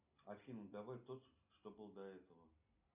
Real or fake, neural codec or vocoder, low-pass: real; none; 3.6 kHz